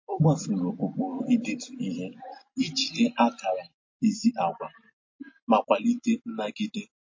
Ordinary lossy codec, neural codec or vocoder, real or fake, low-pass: MP3, 32 kbps; none; real; 7.2 kHz